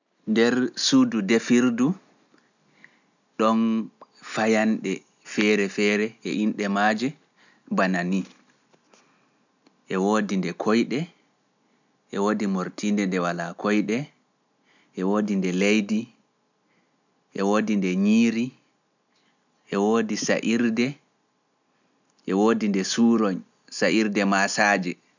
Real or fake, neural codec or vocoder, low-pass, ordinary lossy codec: real; none; 7.2 kHz; none